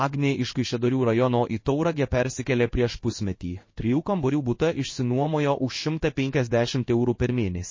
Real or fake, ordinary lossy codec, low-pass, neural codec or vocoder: fake; MP3, 32 kbps; 7.2 kHz; codec, 16 kHz in and 24 kHz out, 1 kbps, XY-Tokenizer